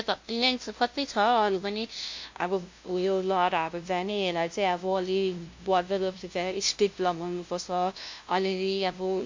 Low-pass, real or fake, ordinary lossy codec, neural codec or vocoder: 7.2 kHz; fake; MP3, 48 kbps; codec, 16 kHz, 0.5 kbps, FunCodec, trained on LibriTTS, 25 frames a second